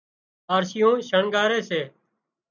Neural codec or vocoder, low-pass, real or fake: none; 7.2 kHz; real